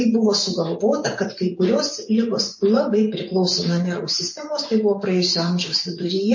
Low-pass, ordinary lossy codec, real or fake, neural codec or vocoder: 7.2 kHz; MP3, 32 kbps; fake; vocoder, 24 kHz, 100 mel bands, Vocos